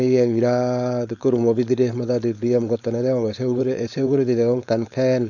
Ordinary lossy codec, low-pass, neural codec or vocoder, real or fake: none; 7.2 kHz; codec, 16 kHz, 4.8 kbps, FACodec; fake